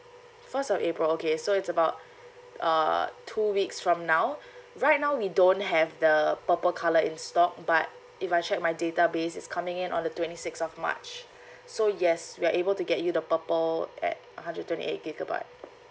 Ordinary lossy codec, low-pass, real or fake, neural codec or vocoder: none; none; real; none